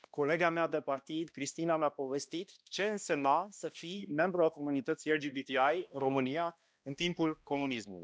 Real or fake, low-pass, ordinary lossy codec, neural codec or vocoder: fake; none; none; codec, 16 kHz, 1 kbps, X-Codec, HuBERT features, trained on balanced general audio